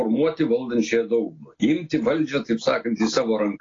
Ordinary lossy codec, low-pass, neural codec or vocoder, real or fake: AAC, 32 kbps; 7.2 kHz; none; real